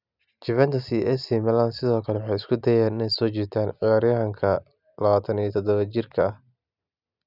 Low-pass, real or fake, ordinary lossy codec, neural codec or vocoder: 5.4 kHz; real; none; none